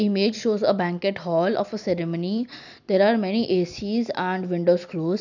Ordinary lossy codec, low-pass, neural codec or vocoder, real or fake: none; 7.2 kHz; none; real